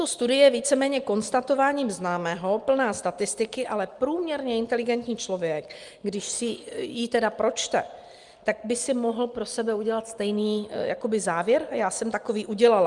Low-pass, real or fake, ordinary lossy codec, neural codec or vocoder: 10.8 kHz; real; Opus, 32 kbps; none